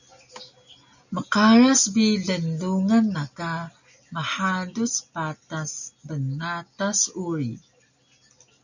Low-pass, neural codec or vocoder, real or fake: 7.2 kHz; none; real